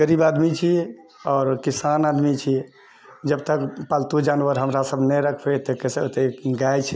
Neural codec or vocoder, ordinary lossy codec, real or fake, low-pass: none; none; real; none